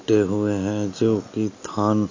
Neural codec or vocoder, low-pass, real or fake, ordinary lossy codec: none; 7.2 kHz; real; AAC, 48 kbps